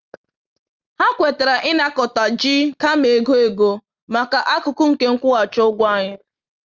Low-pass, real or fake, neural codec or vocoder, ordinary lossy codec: 7.2 kHz; real; none; Opus, 32 kbps